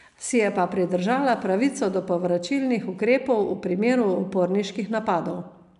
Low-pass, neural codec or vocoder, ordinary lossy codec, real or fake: 10.8 kHz; none; none; real